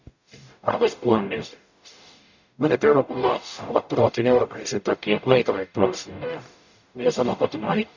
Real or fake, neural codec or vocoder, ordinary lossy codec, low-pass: fake; codec, 44.1 kHz, 0.9 kbps, DAC; none; 7.2 kHz